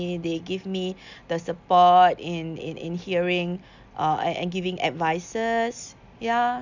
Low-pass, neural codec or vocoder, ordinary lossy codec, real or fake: 7.2 kHz; none; none; real